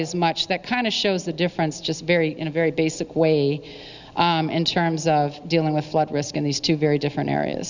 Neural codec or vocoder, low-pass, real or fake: none; 7.2 kHz; real